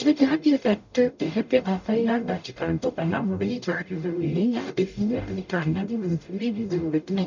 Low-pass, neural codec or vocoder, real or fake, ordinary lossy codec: 7.2 kHz; codec, 44.1 kHz, 0.9 kbps, DAC; fake; none